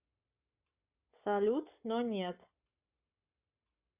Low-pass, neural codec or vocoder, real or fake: 3.6 kHz; codec, 44.1 kHz, 7.8 kbps, Pupu-Codec; fake